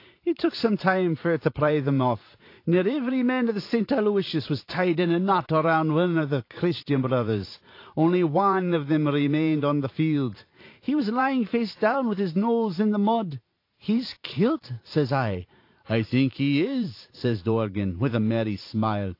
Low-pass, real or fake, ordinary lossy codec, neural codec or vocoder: 5.4 kHz; fake; AAC, 32 kbps; vocoder, 44.1 kHz, 128 mel bands every 512 samples, BigVGAN v2